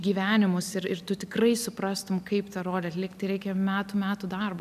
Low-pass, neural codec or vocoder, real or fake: 14.4 kHz; none; real